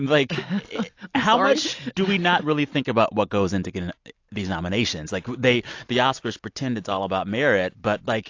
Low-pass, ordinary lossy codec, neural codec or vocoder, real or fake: 7.2 kHz; AAC, 48 kbps; none; real